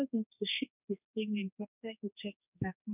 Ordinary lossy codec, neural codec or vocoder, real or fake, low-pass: none; codec, 16 kHz, 2 kbps, X-Codec, HuBERT features, trained on general audio; fake; 3.6 kHz